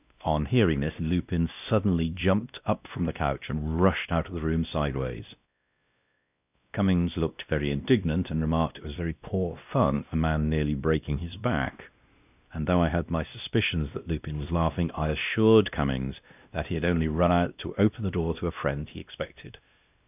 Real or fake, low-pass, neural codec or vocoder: fake; 3.6 kHz; codec, 16 kHz, 1 kbps, X-Codec, WavLM features, trained on Multilingual LibriSpeech